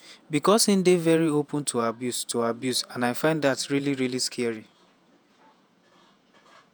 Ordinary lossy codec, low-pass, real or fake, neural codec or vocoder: none; none; fake; vocoder, 48 kHz, 128 mel bands, Vocos